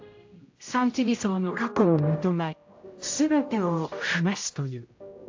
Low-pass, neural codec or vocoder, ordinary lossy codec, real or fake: 7.2 kHz; codec, 16 kHz, 0.5 kbps, X-Codec, HuBERT features, trained on general audio; AAC, 48 kbps; fake